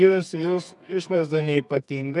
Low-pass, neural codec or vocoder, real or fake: 10.8 kHz; codec, 24 kHz, 0.9 kbps, WavTokenizer, medium music audio release; fake